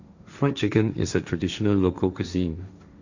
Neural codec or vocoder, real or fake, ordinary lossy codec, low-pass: codec, 16 kHz, 1.1 kbps, Voila-Tokenizer; fake; none; 7.2 kHz